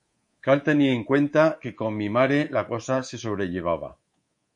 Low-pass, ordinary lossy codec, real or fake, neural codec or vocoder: 10.8 kHz; MP3, 48 kbps; fake; codec, 24 kHz, 3.1 kbps, DualCodec